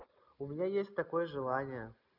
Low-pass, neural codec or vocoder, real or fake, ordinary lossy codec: 5.4 kHz; none; real; none